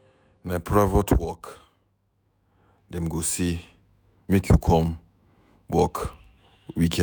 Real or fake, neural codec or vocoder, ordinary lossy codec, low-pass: fake; autoencoder, 48 kHz, 128 numbers a frame, DAC-VAE, trained on Japanese speech; none; none